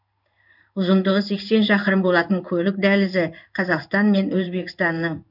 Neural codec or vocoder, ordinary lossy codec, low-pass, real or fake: codec, 16 kHz in and 24 kHz out, 1 kbps, XY-Tokenizer; none; 5.4 kHz; fake